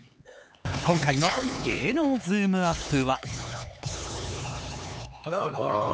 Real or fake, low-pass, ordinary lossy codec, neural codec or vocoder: fake; none; none; codec, 16 kHz, 4 kbps, X-Codec, HuBERT features, trained on LibriSpeech